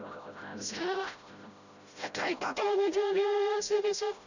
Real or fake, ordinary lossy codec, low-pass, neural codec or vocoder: fake; none; 7.2 kHz; codec, 16 kHz, 0.5 kbps, FreqCodec, smaller model